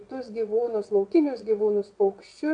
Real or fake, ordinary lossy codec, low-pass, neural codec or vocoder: fake; MP3, 64 kbps; 9.9 kHz; vocoder, 22.05 kHz, 80 mel bands, WaveNeXt